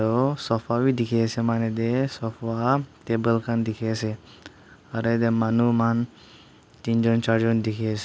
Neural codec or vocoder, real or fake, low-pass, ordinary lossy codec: none; real; none; none